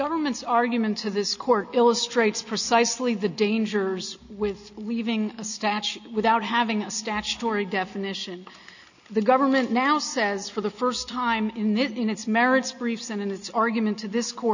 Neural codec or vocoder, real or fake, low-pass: none; real; 7.2 kHz